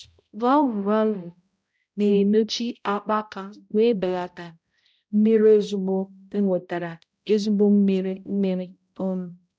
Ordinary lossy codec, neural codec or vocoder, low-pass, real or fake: none; codec, 16 kHz, 0.5 kbps, X-Codec, HuBERT features, trained on balanced general audio; none; fake